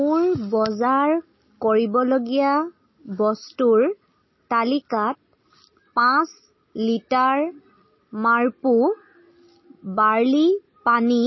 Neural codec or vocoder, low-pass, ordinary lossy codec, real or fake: none; 7.2 kHz; MP3, 24 kbps; real